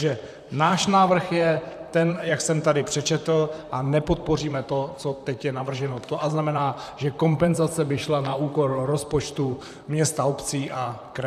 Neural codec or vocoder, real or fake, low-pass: vocoder, 44.1 kHz, 128 mel bands, Pupu-Vocoder; fake; 14.4 kHz